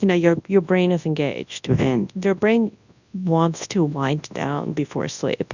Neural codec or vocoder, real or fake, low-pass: codec, 24 kHz, 0.9 kbps, WavTokenizer, large speech release; fake; 7.2 kHz